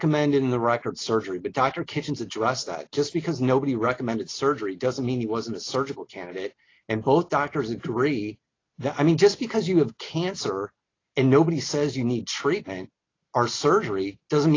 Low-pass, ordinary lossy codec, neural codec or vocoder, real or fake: 7.2 kHz; AAC, 32 kbps; none; real